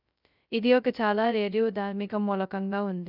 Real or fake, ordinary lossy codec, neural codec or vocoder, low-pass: fake; none; codec, 16 kHz, 0.2 kbps, FocalCodec; 5.4 kHz